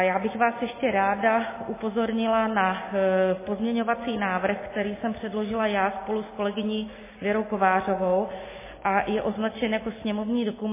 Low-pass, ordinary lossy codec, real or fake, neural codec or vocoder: 3.6 kHz; MP3, 16 kbps; real; none